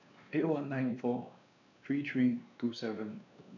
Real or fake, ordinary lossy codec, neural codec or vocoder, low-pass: fake; none; codec, 16 kHz, 2 kbps, X-Codec, WavLM features, trained on Multilingual LibriSpeech; 7.2 kHz